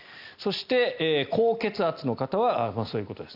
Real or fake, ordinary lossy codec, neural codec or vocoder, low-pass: real; AAC, 48 kbps; none; 5.4 kHz